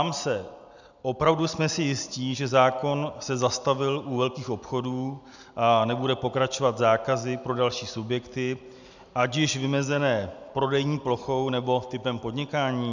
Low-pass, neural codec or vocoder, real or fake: 7.2 kHz; none; real